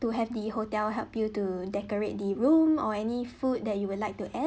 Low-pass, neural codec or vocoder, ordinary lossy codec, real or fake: none; none; none; real